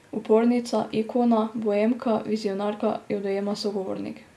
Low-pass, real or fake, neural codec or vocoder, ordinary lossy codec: none; real; none; none